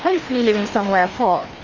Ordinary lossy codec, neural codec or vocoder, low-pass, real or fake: Opus, 32 kbps; codec, 16 kHz, 2 kbps, FreqCodec, larger model; 7.2 kHz; fake